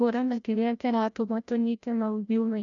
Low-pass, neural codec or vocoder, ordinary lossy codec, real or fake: 7.2 kHz; codec, 16 kHz, 0.5 kbps, FreqCodec, larger model; none; fake